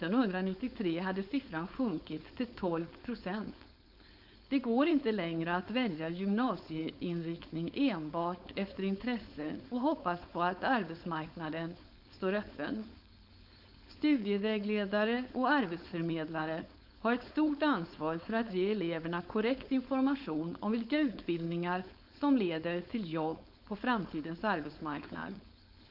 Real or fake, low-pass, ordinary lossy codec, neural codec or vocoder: fake; 5.4 kHz; none; codec, 16 kHz, 4.8 kbps, FACodec